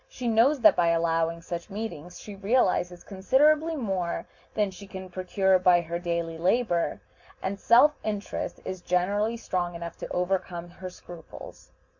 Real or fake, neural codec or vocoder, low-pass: real; none; 7.2 kHz